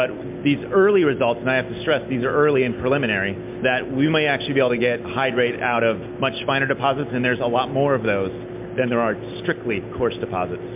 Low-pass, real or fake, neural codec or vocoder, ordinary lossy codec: 3.6 kHz; real; none; MP3, 32 kbps